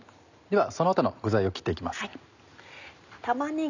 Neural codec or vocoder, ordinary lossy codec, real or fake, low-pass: none; none; real; 7.2 kHz